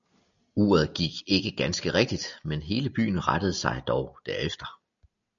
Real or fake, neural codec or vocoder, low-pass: real; none; 7.2 kHz